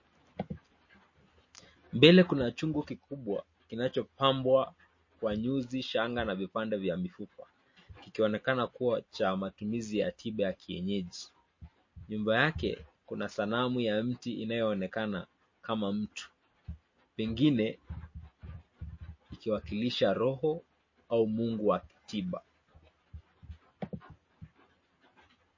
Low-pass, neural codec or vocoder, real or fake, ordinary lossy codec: 7.2 kHz; none; real; MP3, 32 kbps